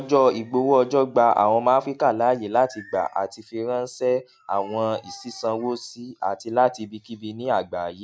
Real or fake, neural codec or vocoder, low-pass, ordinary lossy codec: real; none; none; none